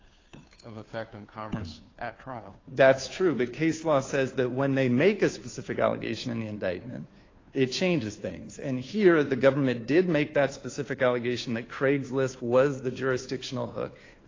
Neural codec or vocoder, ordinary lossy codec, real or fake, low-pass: codec, 16 kHz, 2 kbps, FunCodec, trained on Chinese and English, 25 frames a second; AAC, 32 kbps; fake; 7.2 kHz